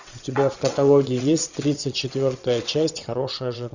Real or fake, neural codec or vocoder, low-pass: fake; vocoder, 22.05 kHz, 80 mel bands, WaveNeXt; 7.2 kHz